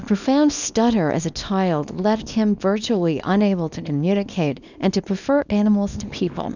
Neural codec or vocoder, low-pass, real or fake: codec, 24 kHz, 0.9 kbps, WavTokenizer, small release; 7.2 kHz; fake